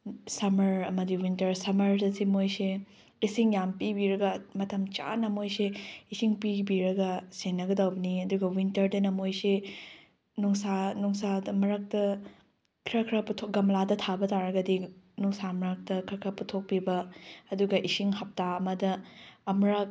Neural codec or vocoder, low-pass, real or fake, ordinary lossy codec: none; none; real; none